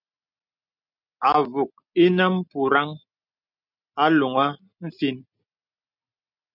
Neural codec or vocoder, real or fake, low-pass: none; real; 5.4 kHz